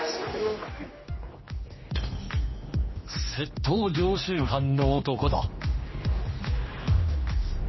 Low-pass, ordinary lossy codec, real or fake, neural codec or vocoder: 7.2 kHz; MP3, 24 kbps; fake; codec, 16 kHz, 2 kbps, X-Codec, HuBERT features, trained on general audio